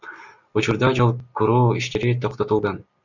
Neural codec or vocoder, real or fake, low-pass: none; real; 7.2 kHz